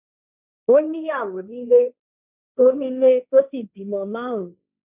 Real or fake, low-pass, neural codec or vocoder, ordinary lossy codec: fake; 3.6 kHz; codec, 16 kHz, 1.1 kbps, Voila-Tokenizer; none